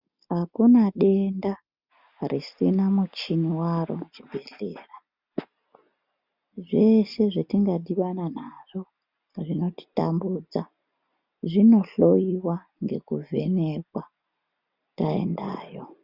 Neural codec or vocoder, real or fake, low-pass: none; real; 5.4 kHz